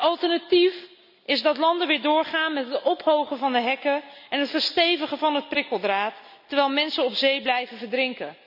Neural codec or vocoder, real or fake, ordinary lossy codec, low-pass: none; real; none; 5.4 kHz